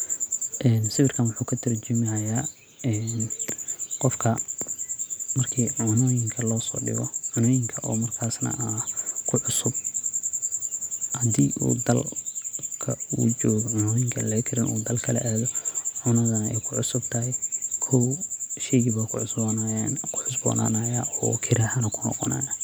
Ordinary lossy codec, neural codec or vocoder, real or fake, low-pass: none; none; real; none